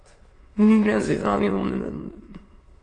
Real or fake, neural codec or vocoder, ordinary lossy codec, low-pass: fake; autoencoder, 22.05 kHz, a latent of 192 numbers a frame, VITS, trained on many speakers; AAC, 32 kbps; 9.9 kHz